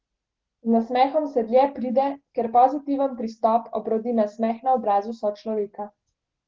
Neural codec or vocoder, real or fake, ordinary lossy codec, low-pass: none; real; Opus, 16 kbps; 7.2 kHz